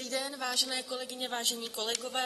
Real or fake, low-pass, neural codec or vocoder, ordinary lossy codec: real; 19.8 kHz; none; AAC, 32 kbps